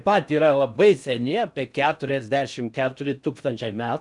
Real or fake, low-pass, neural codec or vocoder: fake; 10.8 kHz; codec, 16 kHz in and 24 kHz out, 0.6 kbps, FocalCodec, streaming, 4096 codes